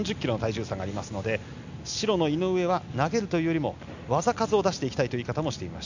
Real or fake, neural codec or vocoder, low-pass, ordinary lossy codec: real; none; 7.2 kHz; none